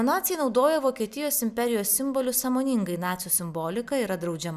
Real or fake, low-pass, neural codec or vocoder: real; 14.4 kHz; none